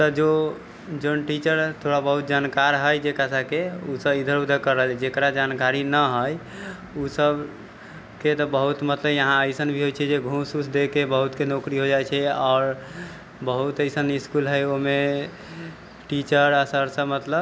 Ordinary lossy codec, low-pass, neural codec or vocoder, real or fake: none; none; none; real